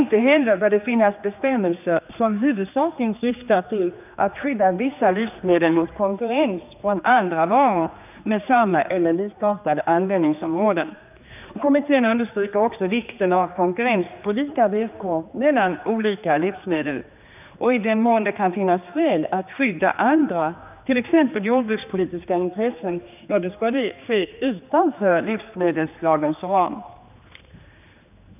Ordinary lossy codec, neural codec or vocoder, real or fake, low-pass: none; codec, 16 kHz, 2 kbps, X-Codec, HuBERT features, trained on general audio; fake; 3.6 kHz